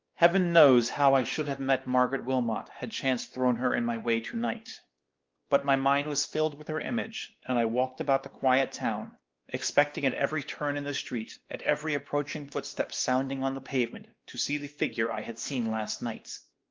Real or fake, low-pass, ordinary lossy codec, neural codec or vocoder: fake; 7.2 kHz; Opus, 32 kbps; codec, 16 kHz, 2 kbps, X-Codec, WavLM features, trained on Multilingual LibriSpeech